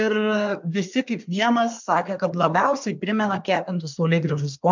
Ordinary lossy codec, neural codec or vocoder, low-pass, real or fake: MP3, 64 kbps; codec, 24 kHz, 1 kbps, SNAC; 7.2 kHz; fake